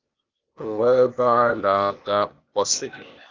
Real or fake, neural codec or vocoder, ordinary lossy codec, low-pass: fake; codec, 16 kHz, 0.8 kbps, ZipCodec; Opus, 32 kbps; 7.2 kHz